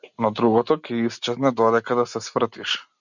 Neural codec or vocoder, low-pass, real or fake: none; 7.2 kHz; real